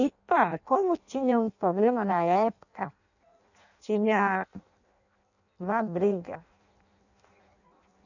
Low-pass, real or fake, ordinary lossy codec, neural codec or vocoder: 7.2 kHz; fake; none; codec, 16 kHz in and 24 kHz out, 0.6 kbps, FireRedTTS-2 codec